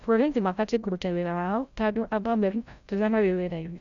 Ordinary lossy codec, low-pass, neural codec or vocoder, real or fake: none; 7.2 kHz; codec, 16 kHz, 0.5 kbps, FreqCodec, larger model; fake